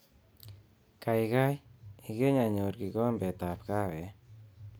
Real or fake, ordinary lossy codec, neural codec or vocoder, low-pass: real; none; none; none